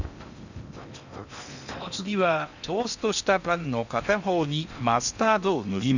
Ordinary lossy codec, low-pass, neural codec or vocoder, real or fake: none; 7.2 kHz; codec, 16 kHz in and 24 kHz out, 0.8 kbps, FocalCodec, streaming, 65536 codes; fake